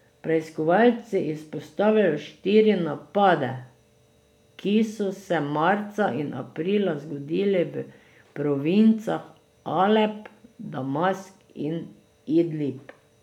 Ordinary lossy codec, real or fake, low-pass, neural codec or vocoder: none; real; 19.8 kHz; none